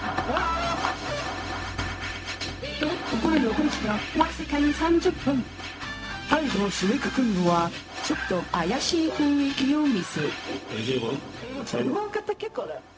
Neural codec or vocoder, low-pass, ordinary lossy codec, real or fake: codec, 16 kHz, 0.4 kbps, LongCat-Audio-Codec; none; none; fake